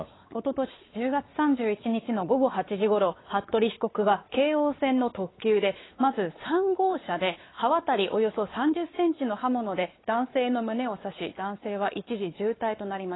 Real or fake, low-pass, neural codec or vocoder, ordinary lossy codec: fake; 7.2 kHz; codec, 16 kHz, 4 kbps, X-Codec, WavLM features, trained on Multilingual LibriSpeech; AAC, 16 kbps